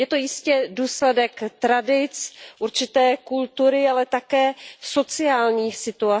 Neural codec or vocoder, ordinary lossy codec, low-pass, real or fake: none; none; none; real